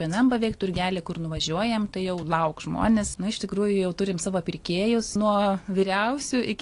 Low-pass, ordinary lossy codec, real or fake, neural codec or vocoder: 10.8 kHz; AAC, 48 kbps; real; none